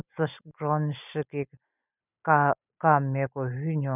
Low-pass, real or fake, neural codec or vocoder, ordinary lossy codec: 3.6 kHz; real; none; none